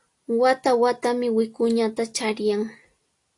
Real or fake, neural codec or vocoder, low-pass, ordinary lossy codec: real; none; 10.8 kHz; AAC, 48 kbps